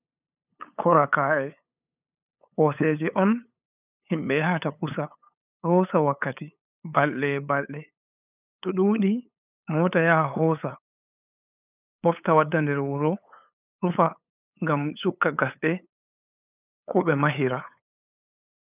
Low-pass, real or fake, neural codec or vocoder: 3.6 kHz; fake; codec, 16 kHz, 8 kbps, FunCodec, trained on LibriTTS, 25 frames a second